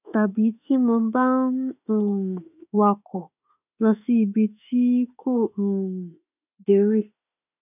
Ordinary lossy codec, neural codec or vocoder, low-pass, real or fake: none; autoencoder, 48 kHz, 32 numbers a frame, DAC-VAE, trained on Japanese speech; 3.6 kHz; fake